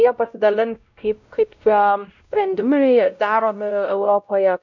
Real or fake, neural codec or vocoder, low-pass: fake; codec, 16 kHz, 0.5 kbps, X-Codec, WavLM features, trained on Multilingual LibriSpeech; 7.2 kHz